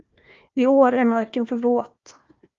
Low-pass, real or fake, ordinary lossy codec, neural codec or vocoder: 7.2 kHz; fake; Opus, 32 kbps; codec, 16 kHz, 1 kbps, FunCodec, trained on Chinese and English, 50 frames a second